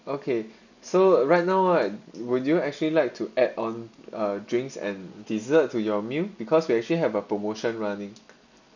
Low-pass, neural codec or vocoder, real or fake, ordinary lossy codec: 7.2 kHz; none; real; none